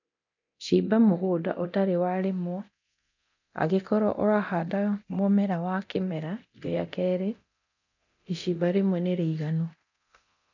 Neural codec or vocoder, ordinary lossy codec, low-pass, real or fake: codec, 24 kHz, 0.9 kbps, DualCodec; none; 7.2 kHz; fake